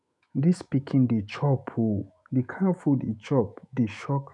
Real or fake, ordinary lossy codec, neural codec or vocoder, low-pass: fake; none; autoencoder, 48 kHz, 128 numbers a frame, DAC-VAE, trained on Japanese speech; 10.8 kHz